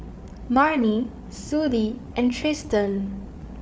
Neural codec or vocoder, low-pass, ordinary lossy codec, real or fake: codec, 16 kHz, 8 kbps, FreqCodec, larger model; none; none; fake